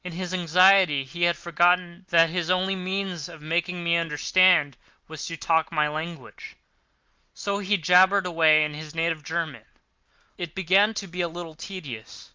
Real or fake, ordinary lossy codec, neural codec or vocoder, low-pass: real; Opus, 32 kbps; none; 7.2 kHz